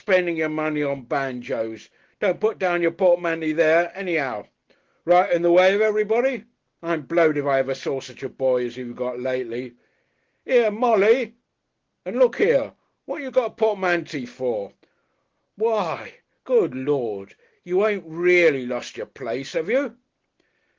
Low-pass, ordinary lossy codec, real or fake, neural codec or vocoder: 7.2 kHz; Opus, 16 kbps; real; none